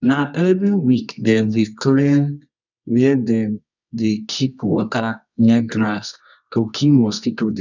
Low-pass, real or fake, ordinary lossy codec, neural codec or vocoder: 7.2 kHz; fake; none; codec, 24 kHz, 0.9 kbps, WavTokenizer, medium music audio release